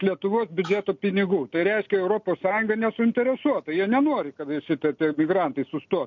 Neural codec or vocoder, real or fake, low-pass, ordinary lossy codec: none; real; 7.2 kHz; MP3, 64 kbps